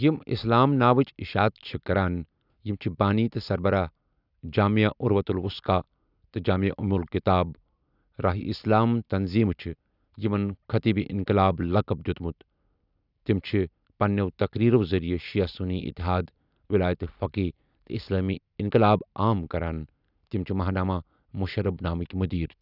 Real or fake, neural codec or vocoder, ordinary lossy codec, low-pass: real; none; none; 5.4 kHz